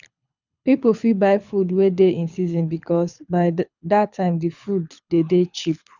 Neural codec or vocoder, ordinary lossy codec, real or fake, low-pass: codec, 24 kHz, 6 kbps, HILCodec; none; fake; 7.2 kHz